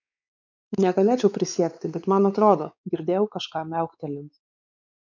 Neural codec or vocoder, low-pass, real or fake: codec, 16 kHz, 4 kbps, X-Codec, WavLM features, trained on Multilingual LibriSpeech; 7.2 kHz; fake